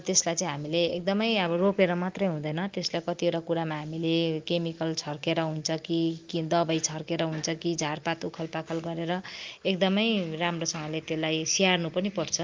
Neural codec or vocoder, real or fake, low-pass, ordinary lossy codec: none; real; 7.2 kHz; Opus, 24 kbps